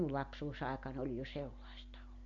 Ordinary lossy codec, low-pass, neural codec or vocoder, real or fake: MP3, 64 kbps; 7.2 kHz; none; real